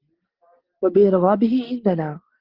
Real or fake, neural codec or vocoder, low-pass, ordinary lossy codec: real; none; 5.4 kHz; Opus, 16 kbps